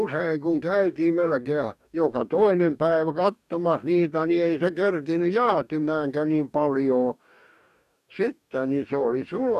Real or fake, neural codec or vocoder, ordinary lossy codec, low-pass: fake; codec, 44.1 kHz, 2.6 kbps, DAC; none; 14.4 kHz